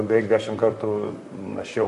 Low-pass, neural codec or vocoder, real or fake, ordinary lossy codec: 14.4 kHz; vocoder, 44.1 kHz, 128 mel bands, Pupu-Vocoder; fake; MP3, 48 kbps